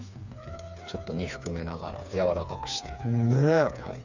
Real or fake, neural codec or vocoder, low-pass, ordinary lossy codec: fake; codec, 16 kHz, 4 kbps, FreqCodec, smaller model; 7.2 kHz; none